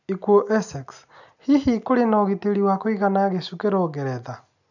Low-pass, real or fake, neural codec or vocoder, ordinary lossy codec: 7.2 kHz; real; none; none